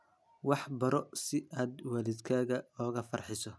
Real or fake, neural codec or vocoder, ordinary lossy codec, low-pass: real; none; none; none